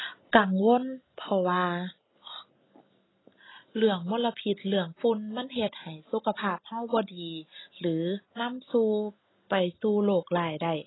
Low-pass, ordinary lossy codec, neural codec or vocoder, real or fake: 7.2 kHz; AAC, 16 kbps; none; real